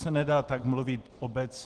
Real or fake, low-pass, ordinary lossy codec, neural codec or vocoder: real; 10.8 kHz; Opus, 16 kbps; none